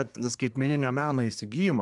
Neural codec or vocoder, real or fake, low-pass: codec, 24 kHz, 1 kbps, SNAC; fake; 10.8 kHz